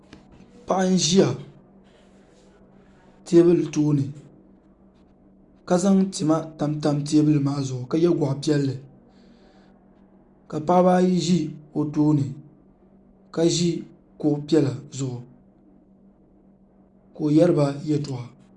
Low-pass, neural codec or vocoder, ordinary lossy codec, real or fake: 10.8 kHz; none; Opus, 64 kbps; real